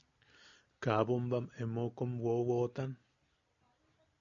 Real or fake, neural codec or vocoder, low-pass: real; none; 7.2 kHz